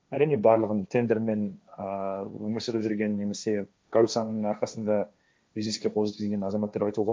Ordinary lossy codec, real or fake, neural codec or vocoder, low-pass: none; fake; codec, 16 kHz, 1.1 kbps, Voila-Tokenizer; none